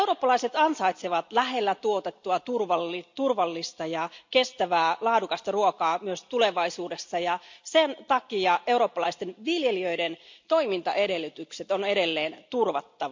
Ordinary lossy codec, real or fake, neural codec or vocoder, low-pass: MP3, 64 kbps; real; none; 7.2 kHz